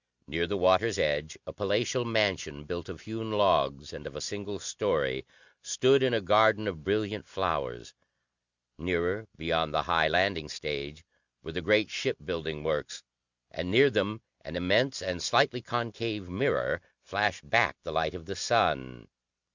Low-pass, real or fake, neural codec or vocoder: 7.2 kHz; real; none